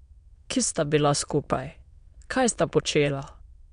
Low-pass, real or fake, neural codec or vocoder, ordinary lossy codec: 9.9 kHz; fake; autoencoder, 22.05 kHz, a latent of 192 numbers a frame, VITS, trained on many speakers; MP3, 64 kbps